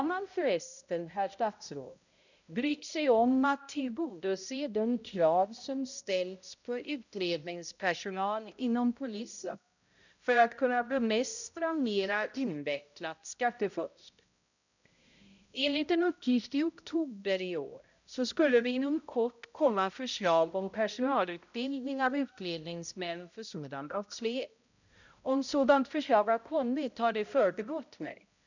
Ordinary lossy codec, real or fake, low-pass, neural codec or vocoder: none; fake; 7.2 kHz; codec, 16 kHz, 0.5 kbps, X-Codec, HuBERT features, trained on balanced general audio